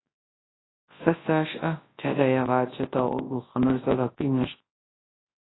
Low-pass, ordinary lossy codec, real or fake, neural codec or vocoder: 7.2 kHz; AAC, 16 kbps; fake; codec, 24 kHz, 0.9 kbps, WavTokenizer, large speech release